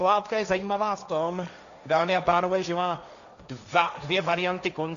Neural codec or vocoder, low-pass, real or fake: codec, 16 kHz, 1.1 kbps, Voila-Tokenizer; 7.2 kHz; fake